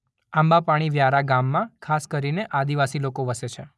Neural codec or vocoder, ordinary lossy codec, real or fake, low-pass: none; none; real; none